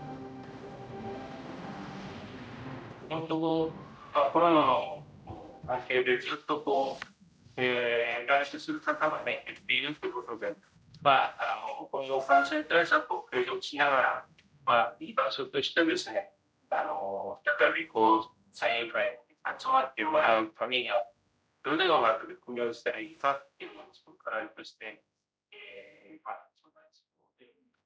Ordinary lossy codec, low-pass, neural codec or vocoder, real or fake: none; none; codec, 16 kHz, 0.5 kbps, X-Codec, HuBERT features, trained on general audio; fake